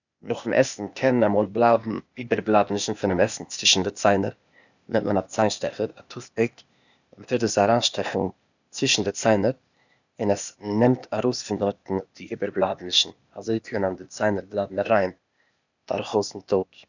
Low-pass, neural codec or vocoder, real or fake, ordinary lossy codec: 7.2 kHz; codec, 16 kHz, 0.8 kbps, ZipCodec; fake; none